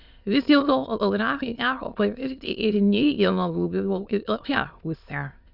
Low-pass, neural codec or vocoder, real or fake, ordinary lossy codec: 5.4 kHz; autoencoder, 22.05 kHz, a latent of 192 numbers a frame, VITS, trained on many speakers; fake; Opus, 64 kbps